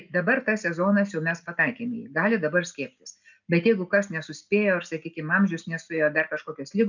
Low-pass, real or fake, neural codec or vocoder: 7.2 kHz; real; none